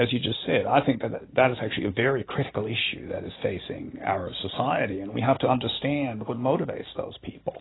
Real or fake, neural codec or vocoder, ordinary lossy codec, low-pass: real; none; AAC, 16 kbps; 7.2 kHz